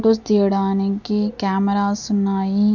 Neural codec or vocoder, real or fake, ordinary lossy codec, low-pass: none; real; none; 7.2 kHz